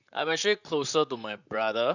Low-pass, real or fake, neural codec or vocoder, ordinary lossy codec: 7.2 kHz; fake; vocoder, 44.1 kHz, 128 mel bands, Pupu-Vocoder; none